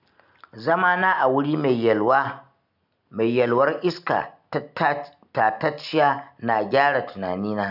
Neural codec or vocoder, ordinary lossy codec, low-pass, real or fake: none; none; 5.4 kHz; real